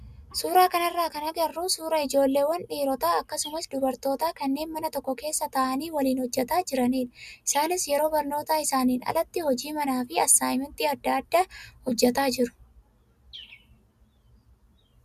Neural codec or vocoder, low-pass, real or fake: none; 14.4 kHz; real